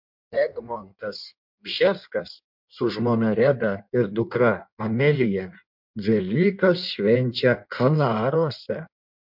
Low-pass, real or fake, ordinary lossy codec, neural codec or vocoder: 5.4 kHz; fake; MP3, 48 kbps; codec, 16 kHz in and 24 kHz out, 1.1 kbps, FireRedTTS-2 codec